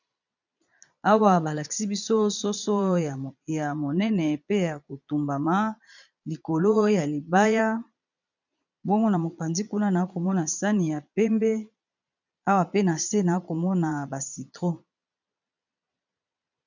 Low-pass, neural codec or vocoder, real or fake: 7.2 kHz; vocoder, 22.05 kHz, 80 mel bands, Vocos; fake